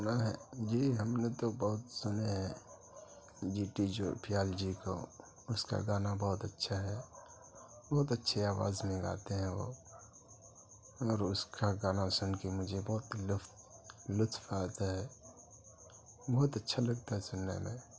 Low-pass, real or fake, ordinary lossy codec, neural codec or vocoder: none; real; none; none